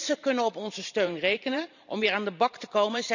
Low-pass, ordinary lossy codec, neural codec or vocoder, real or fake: 7.2 kHz; none; vocoder, 44.1 kHz, 128 mel bands every 512 samples, BigVGAN v2; fake